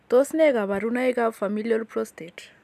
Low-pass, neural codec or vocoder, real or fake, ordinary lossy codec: 14.4 kHz; none; real; none